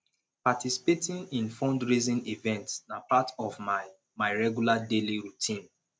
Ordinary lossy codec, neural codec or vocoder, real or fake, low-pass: none; none; real; none